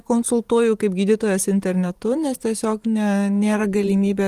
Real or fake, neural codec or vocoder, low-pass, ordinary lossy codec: fake; vocoder, 44.1 kHz, 128 mel bands every 512 samples, BigVGAN v2; 14.4 kHz; Opus, 24 kbps